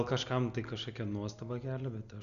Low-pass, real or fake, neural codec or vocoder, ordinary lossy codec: 7.2 kHz; real; none; MP3, 96 kbps